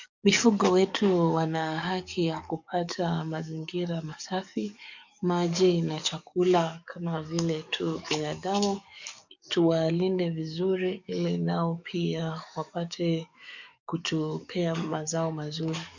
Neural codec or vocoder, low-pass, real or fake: codec, 44.1 kHz, 7.8 kbps, DAC; 7.2 kHz; fake